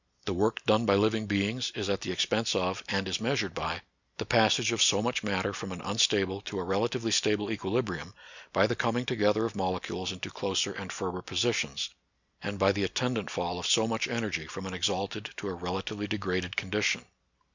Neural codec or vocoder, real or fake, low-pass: none; real; 7.2 kHz